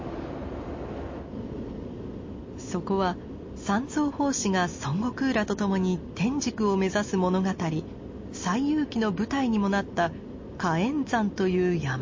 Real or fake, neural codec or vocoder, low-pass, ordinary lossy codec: real; none; 7.2 kHz; MP3, 32 kbps